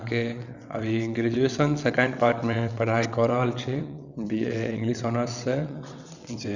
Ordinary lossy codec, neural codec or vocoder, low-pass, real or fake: none; vocoder, 22.05 kHz, 80 mel bands, WaveNeXt; 7.2 kHz; fake